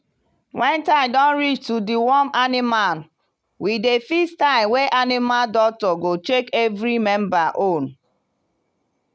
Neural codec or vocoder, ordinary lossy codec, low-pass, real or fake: none; none; none; real